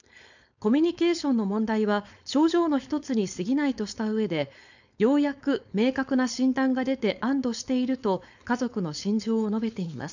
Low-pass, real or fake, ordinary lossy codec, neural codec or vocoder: 7.2 kHz; fake; none; codec, 16 kHz, 4.8 kbps, FACodec